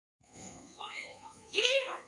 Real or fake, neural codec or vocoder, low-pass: fake; codec, 24 kHz, 1.2 kbps, DualCodec; 10.8 kHz